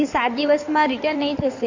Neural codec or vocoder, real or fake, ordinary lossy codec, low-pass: codec, 16 kHz in and 24 kHz out, 2.2 kbps, FireRedTTS-2 codec; fake; AAC, 48 kbps; 7.2 kHz